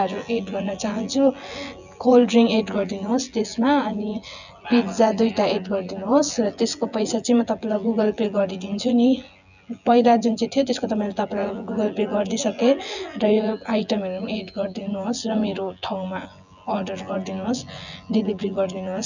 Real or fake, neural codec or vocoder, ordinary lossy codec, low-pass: fake; vocoder, 24 kHz, 100 mel bands, Vocos; none; 7.2 kHz